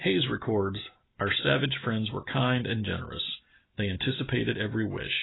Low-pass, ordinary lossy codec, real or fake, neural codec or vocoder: 7.2 kHz; AAC, 16 kbps; real; none